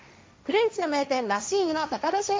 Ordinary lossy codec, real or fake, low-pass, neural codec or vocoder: none; fake; none; codec, 16 kHz, 1.1 kbps, Voila-Tokenizer